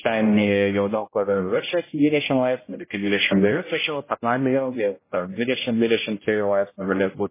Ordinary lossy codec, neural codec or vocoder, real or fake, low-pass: MP3, 16 kbps; codec, 16 kHz, 0.5 kbps, X-Codec, HuBERT features, trained on general audio; fake; 3.6 kHz